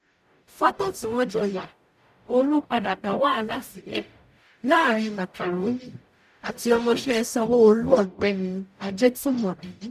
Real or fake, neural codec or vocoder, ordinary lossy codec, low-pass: fake; codec, 44.1 kHz, 0.9 kbps, DAC; none; 14.4 kHz